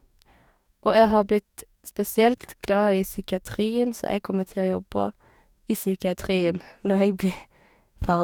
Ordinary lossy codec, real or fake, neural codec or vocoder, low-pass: none; fake; codec, 44.1 kHz, 2.6 kbps, DAC; 19.8 kHz